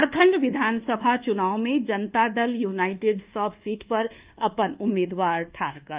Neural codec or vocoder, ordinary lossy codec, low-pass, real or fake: codec, 24 kHz, 1.2 kbps, DualCodec; Opus, 24 kbps; 3.6 kHz; fake